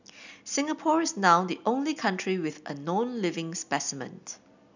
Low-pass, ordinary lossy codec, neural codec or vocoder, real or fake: 7.2 kHz; none; none; real